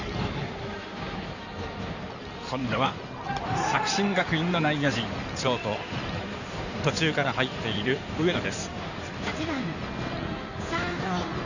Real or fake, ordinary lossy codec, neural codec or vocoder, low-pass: fake; none; codec, 16 kHz in and 24 kHz out, 2.2 kbps, FireRedTTS-2 codec; 7.2 kHz